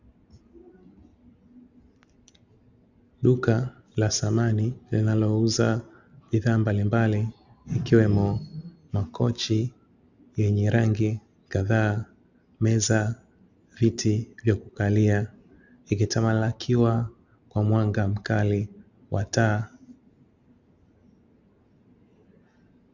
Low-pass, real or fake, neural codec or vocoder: 7.2 kHz; real; none